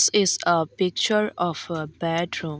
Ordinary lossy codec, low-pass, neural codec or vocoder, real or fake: none; none; none; real